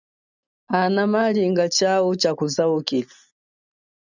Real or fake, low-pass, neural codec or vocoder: real; 7.2 kHz; none